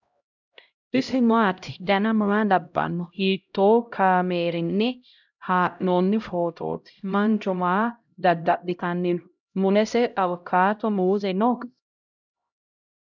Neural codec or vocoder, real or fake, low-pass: codec, 16 kHz, 0.5 kbps, X-Codec, HuBERT features, trained on LibriSpeech; fake; 7.2 kHz